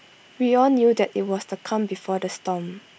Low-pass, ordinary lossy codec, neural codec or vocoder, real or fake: none; none; none; real